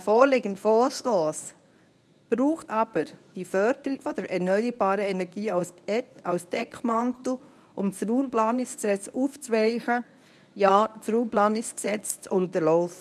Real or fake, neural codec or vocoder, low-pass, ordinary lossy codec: fake; codec, 24 kHz, 0.9 kbps, WavTokenizer, medium speech release version 1; none; none